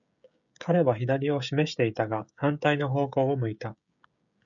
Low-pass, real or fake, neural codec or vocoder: 7.2 kHz; fake; codec, 16 kHz, 16 kbps, FreqCodec, smaller model